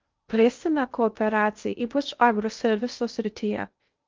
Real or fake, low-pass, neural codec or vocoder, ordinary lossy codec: fake; 7.2 kHz; codec, 16 kHz in and 24 kHz out, 0.6 kbps, FocalCodec, streaming, 2048 codes; Opus, 32 kbps